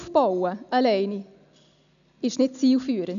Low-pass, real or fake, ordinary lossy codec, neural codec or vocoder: 7.2 kHz; real; none; none